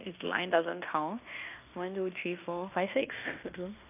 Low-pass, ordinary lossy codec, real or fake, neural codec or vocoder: 3.6 kHz; none; fake; codec, 16 kHz in and 24 kHz out, 0.9 kbps, LongCat-Audio-Codec, fine tuned four codebook decoder